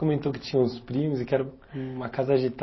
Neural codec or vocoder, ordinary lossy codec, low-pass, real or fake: none; MP3, 24 kbps; 7.2 kHz; real